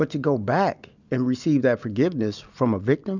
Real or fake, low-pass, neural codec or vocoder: real; 7.2 kHz; none